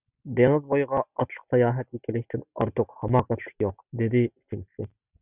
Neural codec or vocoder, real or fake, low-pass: none; real; 3.6 kHz